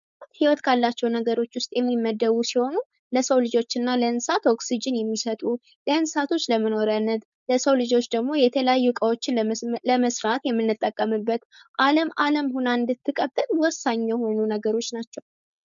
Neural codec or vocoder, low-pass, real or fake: codec, 16 kHz, 4.8 kbps, FACodec; 7.2 kHz; fake